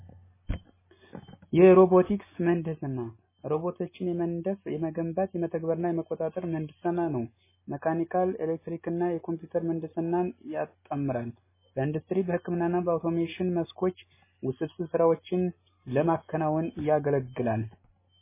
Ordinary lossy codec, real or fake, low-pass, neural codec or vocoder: MP3, 16 kbps; real; 3.6 kHz; none